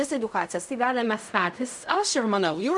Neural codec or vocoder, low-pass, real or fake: codec, 16 kHz in and 24 kHz out, 0.4 kbps, LongCat-Audio-Codec, fine tuned four codebook decoder; 10.8 kHz; fake